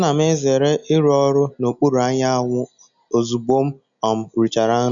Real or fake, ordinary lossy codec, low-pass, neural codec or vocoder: real; none; 7.2 kHz; none